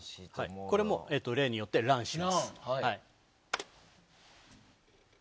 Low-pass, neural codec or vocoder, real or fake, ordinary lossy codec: none; none; real; none